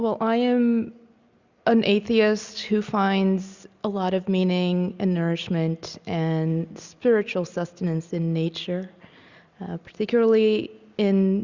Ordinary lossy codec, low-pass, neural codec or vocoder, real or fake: Opus, 64 kbps; 7.2 kHz; none; real